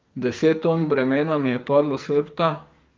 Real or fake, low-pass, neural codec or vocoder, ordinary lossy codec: fake; 7.2 kHz; codec, 44.1 kHz, 2.6 kbps, SNAC; Opus, 24 kbps